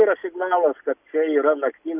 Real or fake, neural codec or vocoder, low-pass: real; none; 3.6 kHz